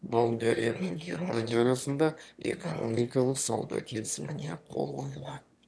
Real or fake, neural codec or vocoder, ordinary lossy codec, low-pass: fake; autoencoder, 22.05 kHz, a latent of 192 numbers a frame, VITS, trained on one speaker; none; none